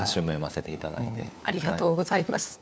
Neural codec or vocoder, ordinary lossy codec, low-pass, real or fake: codec, 16 kHz, 4 kbps, FunCodec, trained on LibriTTS, 50 frames a second; none; none; fake